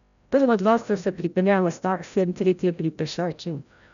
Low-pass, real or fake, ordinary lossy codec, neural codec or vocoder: 7.2 kHz; fake; none; codec, 16 kHz, 0.5 kbps, FreqCodec, larger model